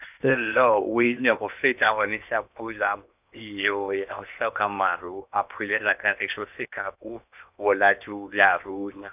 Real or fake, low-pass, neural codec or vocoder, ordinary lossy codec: fake; 3.6 kHz; codec, 16 kHz in and 24 kHz out, 0.8 kbps, FocalCodec, streaming, 65536 codes; none